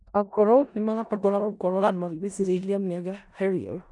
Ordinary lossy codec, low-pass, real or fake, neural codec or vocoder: none; 10.8 kHz; fake; codec, 16 kHz in and 24 kHz out, 0.4 kbps, LongCat-Audio-Codec, four codebook decoder